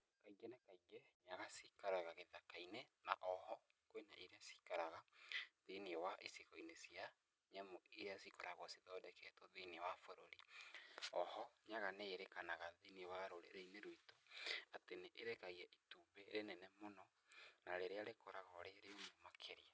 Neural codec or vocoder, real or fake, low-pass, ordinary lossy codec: none; real; none; none